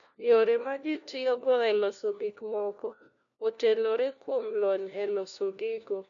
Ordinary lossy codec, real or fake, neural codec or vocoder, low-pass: none; fake; codec, 16 kHz, 1 kbps, FunCodec, trained on LibriTTS, 50 frames a second; 7.2 kHz